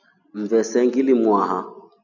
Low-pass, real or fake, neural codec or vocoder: 7.2 kHz; real; none